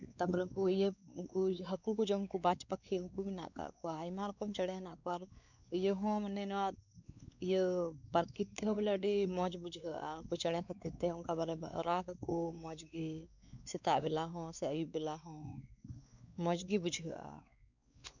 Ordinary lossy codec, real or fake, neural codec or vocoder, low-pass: none; fake; codec, 16 kHz, 4 kbps, X-Codec, WavLM features, trained on Multilingual LibriSpeech; 7.2 kHz